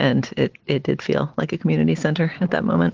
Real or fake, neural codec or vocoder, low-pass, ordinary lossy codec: real; none; 7.2 kHz; Opus, 16 kbps